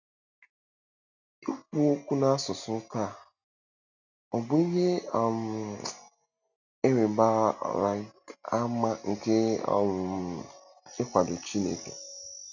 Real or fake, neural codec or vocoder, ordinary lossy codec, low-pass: real; none; none; 7.2 kHz